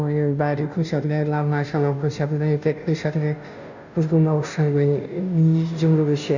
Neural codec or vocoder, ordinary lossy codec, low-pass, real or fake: codec, 16 kHz, 0.5 kbps, FunCodec, trained on Chinese and English, 25 frames a second; none; 7.2 kHz; fake